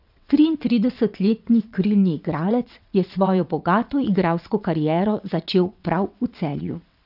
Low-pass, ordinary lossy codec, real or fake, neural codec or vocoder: 5.4 kHz; none; fake; vocoder, 22.05 kHz, 80 mel bands, WaveNeXt